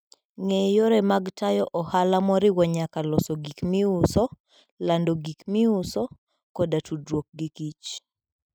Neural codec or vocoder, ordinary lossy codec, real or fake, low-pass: none; none; real; none